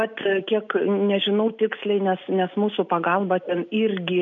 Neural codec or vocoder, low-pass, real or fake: none; 7.2 kHz; real